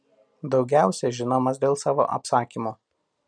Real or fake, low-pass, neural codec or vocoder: real; 9.9 kHz; none